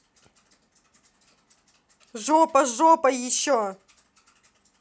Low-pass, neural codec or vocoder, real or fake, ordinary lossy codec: none; none; real; none